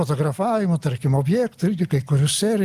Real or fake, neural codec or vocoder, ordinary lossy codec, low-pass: real; none; Opus, 24 kbps; 14.4 kHz